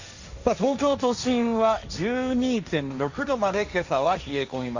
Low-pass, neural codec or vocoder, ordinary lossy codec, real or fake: 7.2 kHz; codec, 16 kHz, 1.1 kbps, Voila-Tokenizer; Opus, 64 kbps; fake